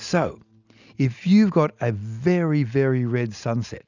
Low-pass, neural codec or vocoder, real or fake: 7.2 kHz; none; real